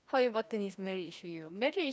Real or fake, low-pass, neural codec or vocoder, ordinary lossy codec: fake; none; codec, 16 kHz, 1 kbps, FreqCodec, larger model; none